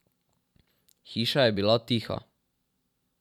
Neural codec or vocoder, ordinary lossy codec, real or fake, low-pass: vocoder, 44.1 kHz, 128 mel bands every 512 samples, BigVGAN v2; none; fake; 19.8 kHz